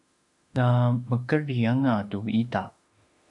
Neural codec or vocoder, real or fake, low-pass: autoencoder, 48 kHz, 32 numbers a frame, DAC-VAE, trained on Japanese speech; fake; 10.8 kHz